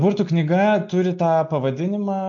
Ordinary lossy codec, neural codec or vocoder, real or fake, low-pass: MP3, 48 kbps; none; real; 7.2 kHz